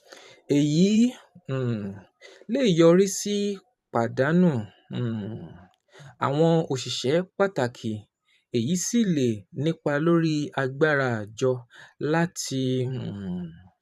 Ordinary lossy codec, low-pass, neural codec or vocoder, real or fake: none; 14.4 kHz; vocoder, 44.1 kHz, 128 mel bands every 512 samples, BigVGAN v2; fake